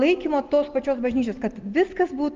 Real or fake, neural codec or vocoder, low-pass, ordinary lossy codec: real; none; 7.2 kHz; Opus, 24 kbps